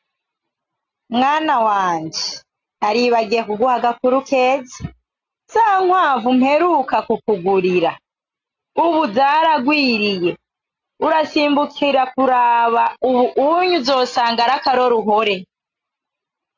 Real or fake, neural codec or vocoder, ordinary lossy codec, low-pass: real; none; AAC, 48 kbps; 7.2 kHz